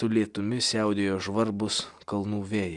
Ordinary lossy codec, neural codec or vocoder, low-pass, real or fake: Opus, 64 kbps; none; 10.8 kHz; real